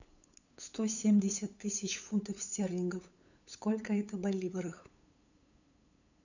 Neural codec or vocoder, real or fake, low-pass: codec, 16 kHz, 8 kbps, FunCodec, trained on LibriTTS, 25 frames a second; fake; 7.2 kHz